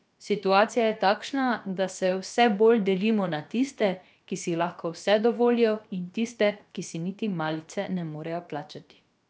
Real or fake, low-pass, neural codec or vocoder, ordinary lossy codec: fake; none; codec, 16 kHz, about 1 kbps, DyCAST, with the encoder's durations; none